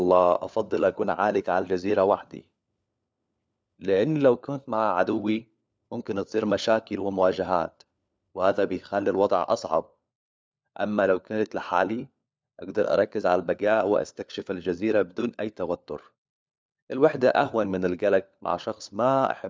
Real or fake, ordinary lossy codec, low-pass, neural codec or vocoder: fake; none; none; codec, 16 kHz, 4 kbps, FunCodec, trained on LibriTTS, 50 frames a second